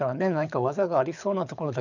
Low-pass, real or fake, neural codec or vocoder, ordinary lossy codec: 7.2 kHz; fake; codec, 24 kHz, 6 kbps, HILCodec; none